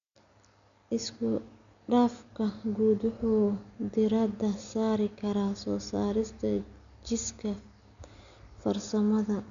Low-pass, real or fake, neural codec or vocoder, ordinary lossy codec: 7.2 kHz; real; none; none